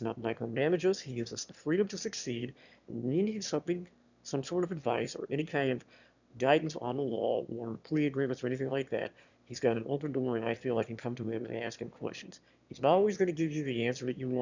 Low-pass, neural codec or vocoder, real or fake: 7.2 kHz; autoencoder, 22.05 kHz, a latent of 192 numbers a frame, VITS, trained on one speaker; fake